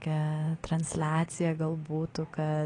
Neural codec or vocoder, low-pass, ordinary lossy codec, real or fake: none; 9.9 kHz; AAC, 32 kbps; real